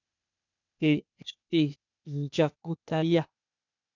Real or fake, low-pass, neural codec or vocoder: fake; 7.2 kHz; codec, 16 kHz, 0.8 kbps, ZipCodec